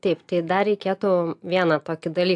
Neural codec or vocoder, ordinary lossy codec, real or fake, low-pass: none; AAC, 64 kbps; real; 10.8 kHz